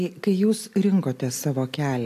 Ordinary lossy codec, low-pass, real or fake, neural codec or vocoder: AAC, 64 kbps; 14.4 kHz; real; none